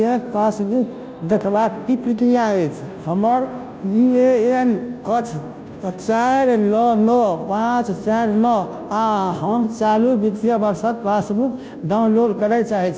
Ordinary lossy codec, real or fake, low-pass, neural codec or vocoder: none; fake; none; codec, 16 kHz, 0.5 kbps, FunCodec, trained on Chinese and English, 25 frames a second